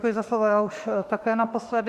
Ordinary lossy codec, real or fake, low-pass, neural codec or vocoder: Opus, 64 kbps; fake; 14.4 kHz; autoencoder, 48 kHz, 32 numbers a frame, DAC-VAE, trained on Japanese speech